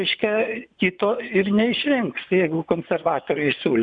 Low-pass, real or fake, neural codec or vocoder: 9.9 kHz; fake; vocoder, 24 kHz, 100 mel bands, Vocos